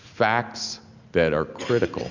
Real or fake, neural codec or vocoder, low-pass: real; none; 7.2 kHz